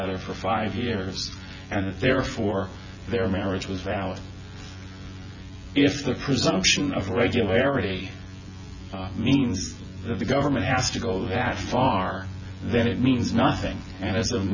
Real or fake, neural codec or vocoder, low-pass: fake; vocoder, 24 kHz, 100 mel bands, Vocos; 7.2 kHz